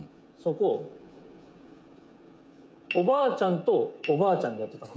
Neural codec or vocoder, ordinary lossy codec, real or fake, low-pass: codec, 16 kHz, 16 kbps, FreqCodec, smaller model; none; fake; none